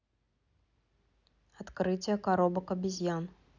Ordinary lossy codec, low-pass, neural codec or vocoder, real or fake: none; 7.2 kHz; none; real